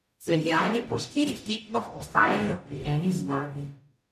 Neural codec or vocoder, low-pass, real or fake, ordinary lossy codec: codec, 44.1 kHz, 0.9 kbps, DAC; 14.4 kHz; fake; none